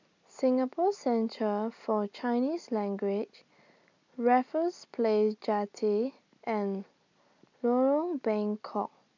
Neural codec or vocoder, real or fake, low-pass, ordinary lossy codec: none; real; 7.2 kHz; MP3, 64 kbps